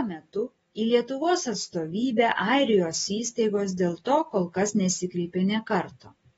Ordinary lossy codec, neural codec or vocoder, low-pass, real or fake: AAC, 24 kbps; none; 14.4 kHz; real